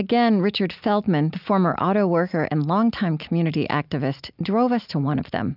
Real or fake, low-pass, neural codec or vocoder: real; 5.4 kHz; none